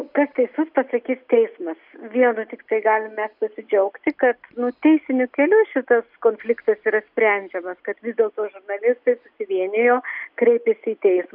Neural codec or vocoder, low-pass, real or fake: none; 5.4 kHz; real